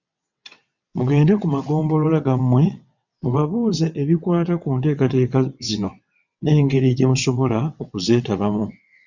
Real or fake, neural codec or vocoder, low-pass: fake; vocoder, 22.05 kHz, 80 mel bands, WaveNeXt; 7.2 kHz